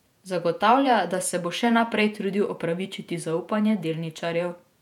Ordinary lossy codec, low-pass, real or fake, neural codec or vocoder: none; 19.8 kHz; fake; vocoder, 48 kHz, 128 mel bands, Vocos